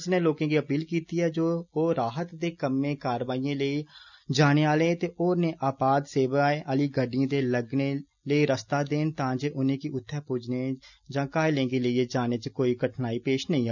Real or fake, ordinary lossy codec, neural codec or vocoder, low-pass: real; none; none; 7.2 kHz